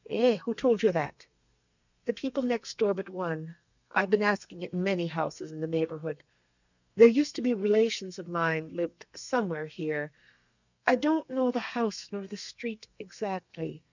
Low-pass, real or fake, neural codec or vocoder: 7.2 kHz; fake; codec, 32 kHz, 1.9 kbps, SNAC